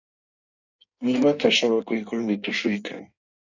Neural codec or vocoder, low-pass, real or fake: codec, 16 kHz in and 24 kHz out, 1.1 kbps, FireRedTTS-2 codec; 7.2 kHz; fake